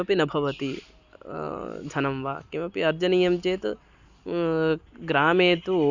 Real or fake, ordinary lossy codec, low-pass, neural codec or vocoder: real; Opus, 64 kbps; 7.2 kHz; none